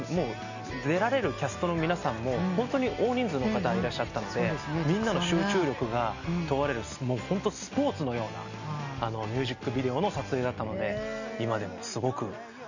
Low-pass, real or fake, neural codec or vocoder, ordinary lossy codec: 7.2 kHz; real; none; none